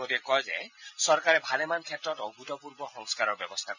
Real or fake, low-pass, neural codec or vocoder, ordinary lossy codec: real; 7.2 kHz; none; none